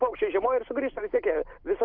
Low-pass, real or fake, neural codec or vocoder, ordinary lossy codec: 5.4 kHz; real; none; Opus, 32 kbps